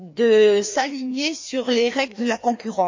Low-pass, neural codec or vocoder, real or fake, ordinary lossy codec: 7.2 kHz; codec, 16 kHz, 2 kbps, FreqCodec, larger model; fake; MP3, 48 kbps